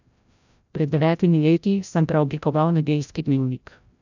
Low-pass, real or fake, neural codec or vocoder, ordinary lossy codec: 7.2 kHz; fake; codec, 16 kHz, 0.5 kbps, FreqCodec, larger model; none